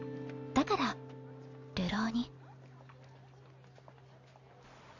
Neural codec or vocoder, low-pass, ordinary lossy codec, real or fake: none; 7.2 kHz; none; real